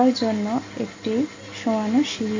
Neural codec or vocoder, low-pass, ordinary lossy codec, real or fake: none; 7.2 kHz; MP3, 48 kbps; real